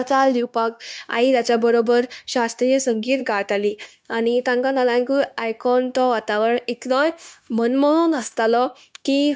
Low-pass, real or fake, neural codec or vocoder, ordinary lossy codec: none; fake; codec, 16 kHz, 0.9 kbps, LongCat-Audio-Codec; none